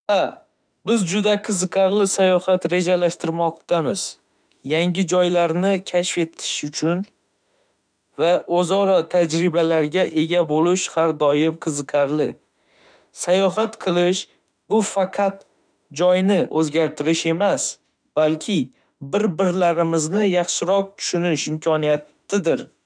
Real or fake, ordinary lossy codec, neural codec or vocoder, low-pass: fake; none; autoencoder, 48 kHz, 32 numbers a frame, DAC-VAE, trained on Japanese speech; 9.9 kHz